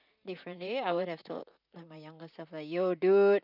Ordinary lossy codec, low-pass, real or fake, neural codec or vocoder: none; 5.4 kHz; fake; vocoder, 44.1 kHz, 128 mel bands, Pupu-Vocoder